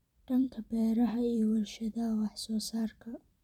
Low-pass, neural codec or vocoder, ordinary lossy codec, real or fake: 19.8 kHz; vocoder, 44.1 kHz, 128 mel bands every 256 samples, BigVGAN v2; none; fake